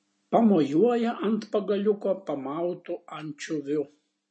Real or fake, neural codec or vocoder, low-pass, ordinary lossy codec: real; none; 9.9 kHz; MP3, 32 kbps